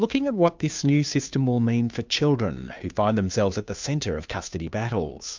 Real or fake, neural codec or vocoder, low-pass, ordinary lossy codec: fake; codec, 16 kHz, 2 kbps, FunCodec, trained on Chinese and English, 25 frames a second; 7.2 kHz; MP3, 64 kbps